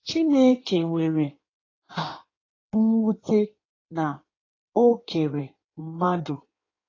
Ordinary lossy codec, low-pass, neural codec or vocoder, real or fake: AAC, 32 kbps; 7.2 kHz; codec, 16 kHz in and 24 kHz out, 1.1 kbps, FireRedTTS-2 codec; fake